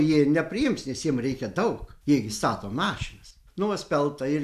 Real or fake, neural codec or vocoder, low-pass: real; none; 14.4 kHz